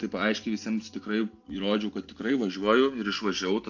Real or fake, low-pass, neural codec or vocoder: real; 7.2 kHz; none